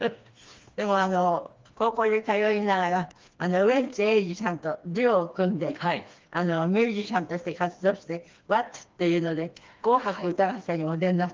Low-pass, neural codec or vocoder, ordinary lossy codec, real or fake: 7.2 kHz; codec, 16 kHz, 2 kbps, FreqCodec, smaller model; Opus, 32 kbps; fake